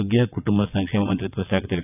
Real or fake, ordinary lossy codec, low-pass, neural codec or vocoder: fake; none; 3.6 kHz; vocoder, 22.05 kHz, 80 mel bands, WaveNeXt